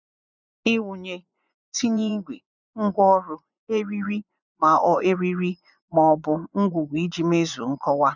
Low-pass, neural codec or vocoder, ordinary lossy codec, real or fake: 7.2 kHz; vocoder, 24 kHz, 100 mel bands, Vocos; none; fake